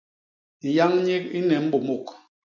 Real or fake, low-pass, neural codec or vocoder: real; 7.2 kHz; none